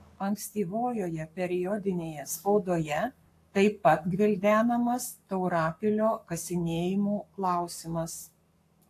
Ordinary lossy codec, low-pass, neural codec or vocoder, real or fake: AAC, 64 kbps; 14.4 kHz; codec, 44.1 kHz, 7.8 kbps, Pupu-Codec; fake